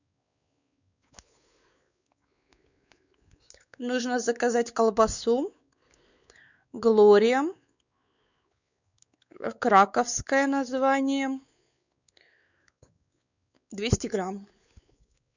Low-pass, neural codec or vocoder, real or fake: 7.2 kHz; codec, 16 kHz, 4 kbps, X-Codec, WavLM features, trained on Multilingual LibriSpeech; fake